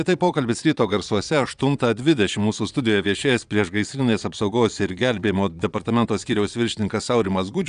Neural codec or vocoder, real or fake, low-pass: vocoder, 22.05 kHz, 80 mel bands, Vocos; fake; 9.9 kHz